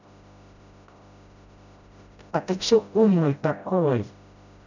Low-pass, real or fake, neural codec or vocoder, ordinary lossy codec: 7.2 kHz; fake; codec, 16 kHz, 0.5 kbps, FreqCodec, smaller model; none